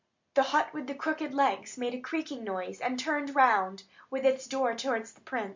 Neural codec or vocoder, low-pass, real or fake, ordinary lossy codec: none; 7.2 kHz; real; MP3, 64 kbps